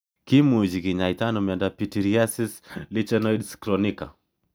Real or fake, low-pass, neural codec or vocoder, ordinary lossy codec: real; none; none; none